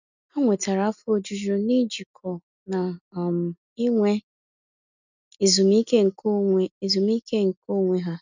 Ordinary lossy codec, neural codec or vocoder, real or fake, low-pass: none; none; real; 7.2 kHz